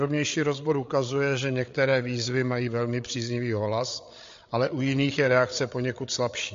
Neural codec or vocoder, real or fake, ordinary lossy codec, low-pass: codec, 16 kHz, 8 kbps, FreqCodec, larger model; fake; MP3, 48 kbps; 7.2 kHz